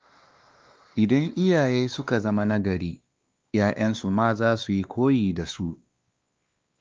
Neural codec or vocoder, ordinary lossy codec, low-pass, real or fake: codec, 16 kHz, 2 kbps, X-Codec, WavLM features, trained on Multilingual LibriSpeech; Opus, 24 kbps; 7.2 kHz; fake